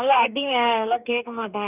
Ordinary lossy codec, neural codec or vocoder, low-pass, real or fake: none; codec, 44.1 kHz, 2.6 kbps, DAC; 3.6 kHz; fake